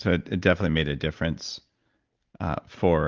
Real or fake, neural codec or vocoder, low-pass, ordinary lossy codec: real; none; 7.2 kHz; Opus, 32 kbps